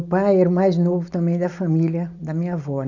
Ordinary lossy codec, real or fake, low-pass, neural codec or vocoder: none; real; 7.2 kHz; none